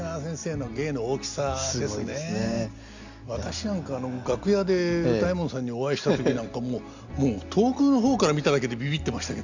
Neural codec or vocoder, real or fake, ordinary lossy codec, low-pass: none; real; Opus, 64 kbps; 7.2 kHz